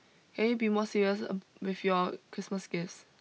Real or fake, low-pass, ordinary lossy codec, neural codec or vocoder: real; none; none; none